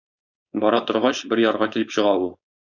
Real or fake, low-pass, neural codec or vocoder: fake; 7.2 kHz; codec, 16 kHz, 4.8 kbps, FACodec